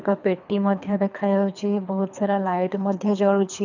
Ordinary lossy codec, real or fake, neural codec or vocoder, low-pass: none; fake; codec, 24 kHz, 3 kbps, HILCodec; 7.2 kHz